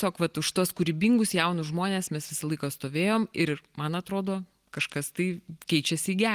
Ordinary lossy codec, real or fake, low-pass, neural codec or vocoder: Opus, 24 kbps; real; 14.4 kHz; none